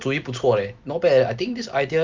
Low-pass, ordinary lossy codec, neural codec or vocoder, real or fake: 7.2 kHz; Opus, 32 kbps; none; real